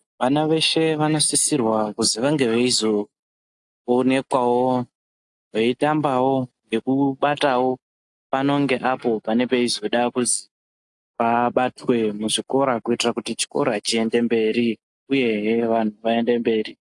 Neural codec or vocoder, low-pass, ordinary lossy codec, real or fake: none; 10.8 kHz; AAC, 64 kbps; real